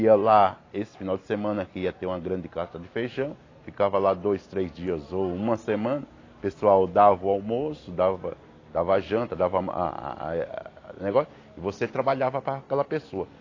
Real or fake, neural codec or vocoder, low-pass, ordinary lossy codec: real; none; 7.2 kHz; AAC, 32 kbps